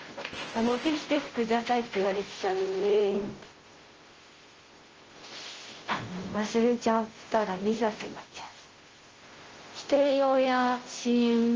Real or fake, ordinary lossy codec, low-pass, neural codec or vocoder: fake; Opus, 16 kbps; 7.2 kHz; codec, 16 kHz, 0.5 kbps, FunCodec, trained on Chinese and English, 25 frames a second